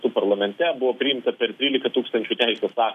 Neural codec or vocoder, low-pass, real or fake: none; 14.4 kHz; real